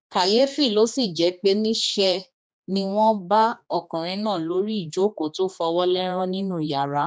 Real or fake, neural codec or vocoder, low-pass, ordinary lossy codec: fake; codec, 16 kHz, 2 kbps, X-Codec, HuBERT features, trained on general audio; none; none